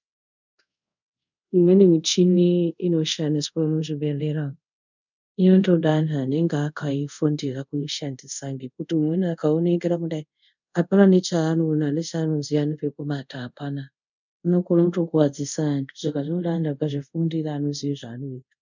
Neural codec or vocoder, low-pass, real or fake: codec, 24 kHz, 0.5 kbps, DualCodec; 7.2 kHz; fake